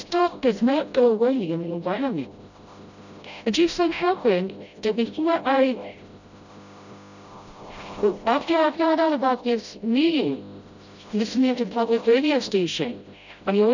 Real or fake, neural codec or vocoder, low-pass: fake; codec, 16 kHz, 0.5 kbps, FreqCodec, smaller model; 7.2 kHz